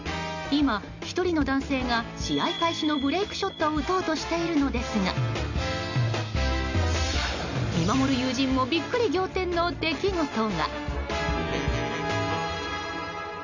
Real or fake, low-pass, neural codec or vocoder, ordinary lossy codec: real; 7.2 kHz; none; none